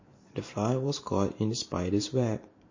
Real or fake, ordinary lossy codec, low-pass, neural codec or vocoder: fake; MP3, 32 kbps; 7.2 kHz; vocoder, 44.1 kHz, 128 mel bands every 512 samples, BigVGAN v2